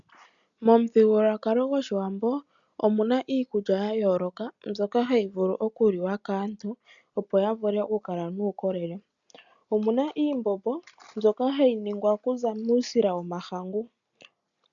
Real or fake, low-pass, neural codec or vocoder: real; 7.2 kHz; none